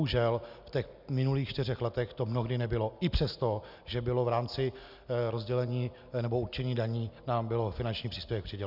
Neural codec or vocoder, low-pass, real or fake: none; 5.4 kHz; real